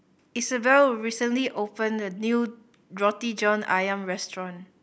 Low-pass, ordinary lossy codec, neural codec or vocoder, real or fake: none; none; none; real